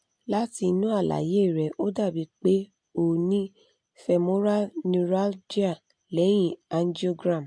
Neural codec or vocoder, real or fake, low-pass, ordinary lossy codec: none; real; 9.9 kHz; MP3, 64 kbps